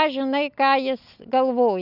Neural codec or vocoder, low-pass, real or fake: none; 5.4 kHz; real